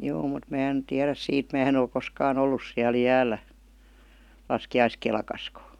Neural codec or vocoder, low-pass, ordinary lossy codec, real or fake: none; 19.8 kHz; none; real